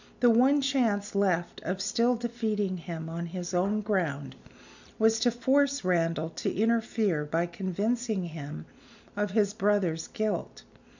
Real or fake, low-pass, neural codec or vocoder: real; 7.2 kHz; none